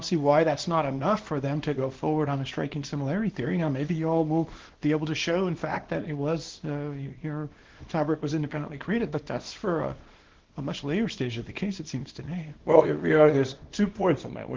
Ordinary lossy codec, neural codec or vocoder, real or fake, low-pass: Opus, 16 kbps; codec, 24 kHz, 0.9 kbps, WavTokenizer, small release; fake; 7.2 kHz